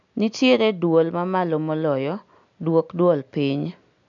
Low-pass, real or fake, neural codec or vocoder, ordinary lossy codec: 7.2 kHz; real; none; none